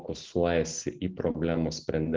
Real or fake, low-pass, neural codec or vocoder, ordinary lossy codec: real; 7.2 kHz; none; Opus, 16 kbps